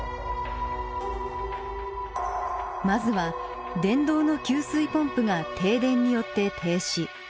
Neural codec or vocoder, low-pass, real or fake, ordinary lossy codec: none; none; real; none